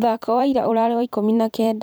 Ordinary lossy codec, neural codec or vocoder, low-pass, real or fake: none; vocoder, 44.1 kHz, 128 mel bands every 512 samples, BigVGAN v2; none; fake